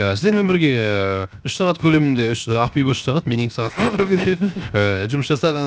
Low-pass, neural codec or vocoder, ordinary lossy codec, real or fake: none; codec, 16 kHz, 0.7 kbps, FocalCodec; none; fake